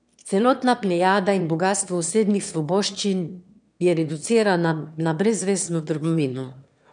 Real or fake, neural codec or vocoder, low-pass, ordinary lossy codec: fake; autoencoder, 22.05 kHz, a latent of 192 numbers a frame, VITS, trained on one speaker; 9.9 kHz; none